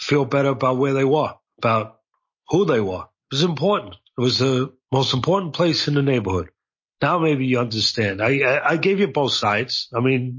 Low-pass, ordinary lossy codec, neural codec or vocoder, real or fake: 7.2 kHz; MP3, 32 kbps; none; real